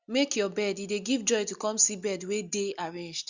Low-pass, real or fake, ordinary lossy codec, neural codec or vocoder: 7.2 kHz; real; Opus, 64 kbps; none